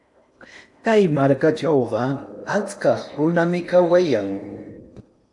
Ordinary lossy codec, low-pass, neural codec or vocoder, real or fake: AAC, 64 kbps; 10.8 kHz; codec, 16 kHz in and 24 kHz out, 0.8 kbps, FocalCodec, streaming, 65536 codes; fake